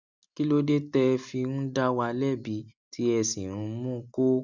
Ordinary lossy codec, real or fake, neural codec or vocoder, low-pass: none; real; none; 7.2 kHz